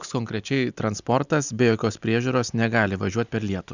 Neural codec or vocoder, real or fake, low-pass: none; real; 7.2 kHz